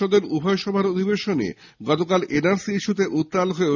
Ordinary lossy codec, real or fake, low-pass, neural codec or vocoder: none; real; 7.2 kHz; none